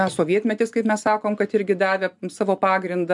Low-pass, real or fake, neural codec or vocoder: 10.8 kHz; real; none